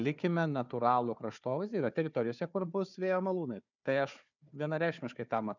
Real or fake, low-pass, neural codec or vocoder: fake; 7.2 kHz; codec, 16 kHz, 4 kbps, FunCodec, trained on Chinese and English, 50 frames a second